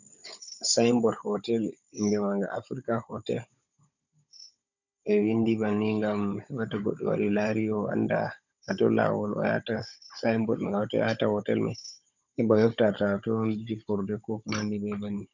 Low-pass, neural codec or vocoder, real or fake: 7.2 kHz; codec, 16 kHz, 16 kbps, FunCodec, trained on Chinese and English, 50 frames a second; fake